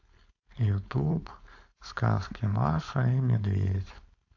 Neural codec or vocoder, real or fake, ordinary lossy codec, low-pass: codec, 16 kHz, 4.8 kbps, FACodec; fake; AAC, 48 kbps; 7.2 kHz